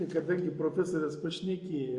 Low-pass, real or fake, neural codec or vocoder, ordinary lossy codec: 10.8 kHz; real; none; Opus, 64 kbps